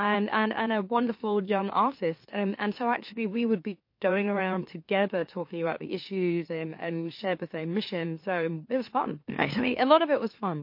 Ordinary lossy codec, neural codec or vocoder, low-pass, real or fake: MP3, 32 kbps; autoencoder, 44.1 kHz, a latent of 192 numbers a frame, MeloTTS; 5.4 kHz; fake